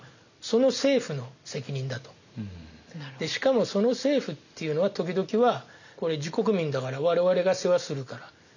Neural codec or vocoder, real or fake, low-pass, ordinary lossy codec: none; real; 7.2 kHz; none